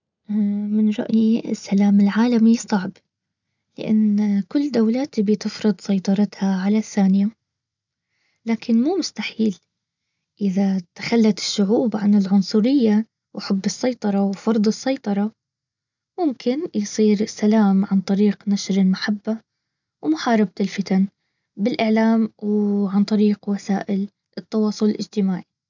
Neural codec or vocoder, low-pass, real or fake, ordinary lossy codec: none; 7.2 kHz; real; none